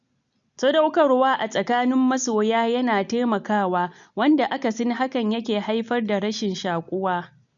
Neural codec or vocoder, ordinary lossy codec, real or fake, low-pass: none; none; real; 7.2 kHz